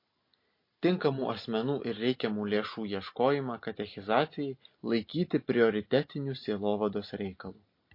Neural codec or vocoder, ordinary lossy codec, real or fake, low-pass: none; MP3, 32 kbps; real; 5.4 kHz